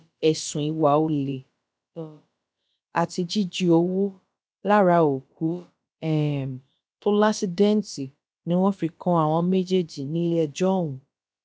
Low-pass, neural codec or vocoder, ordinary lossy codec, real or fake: none; codec, 16 kHz, about 1 kbps, DyCAST, with the encoder's durations; none; fake